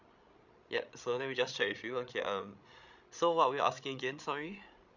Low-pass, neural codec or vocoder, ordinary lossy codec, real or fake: 7.2 kHz; codec, 16 kHz, 16 kbps, FreqCodec, larger model; none; fake